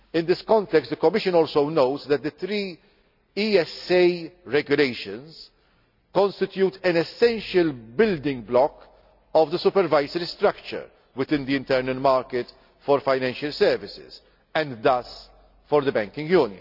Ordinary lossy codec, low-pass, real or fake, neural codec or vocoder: none; 5.4 kHz; real; none